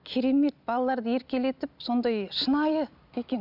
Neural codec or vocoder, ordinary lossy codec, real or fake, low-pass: none; none; real; 5.4 kHz